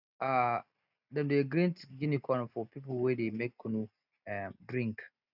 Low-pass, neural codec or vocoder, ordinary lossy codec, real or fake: 5.4 kHz; none; none; real